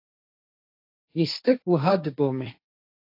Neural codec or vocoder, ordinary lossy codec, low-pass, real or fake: codec, 16 kHz, 1.1 kbps, Voila-Tokenizer; AAC, 32 kbps; 5.4 kHz; fake